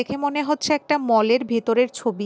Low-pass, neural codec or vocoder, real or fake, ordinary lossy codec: none; none; real; none